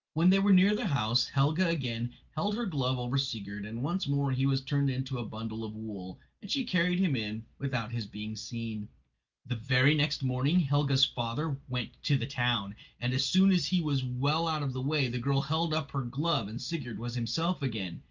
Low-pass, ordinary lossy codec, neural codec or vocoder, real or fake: 7.2 kHz; Opus, 24 kbps; none; real